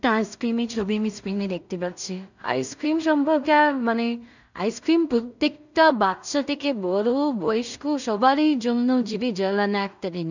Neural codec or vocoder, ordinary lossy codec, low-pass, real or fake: codec, 16 kHz in and 24 kHz out, 0.4 kbps, LongCat-Audio-Codec, two codebook decoder; none; 7.2 kHz; fake